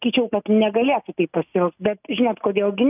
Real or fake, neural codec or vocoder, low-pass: real; none; 3.6 kHz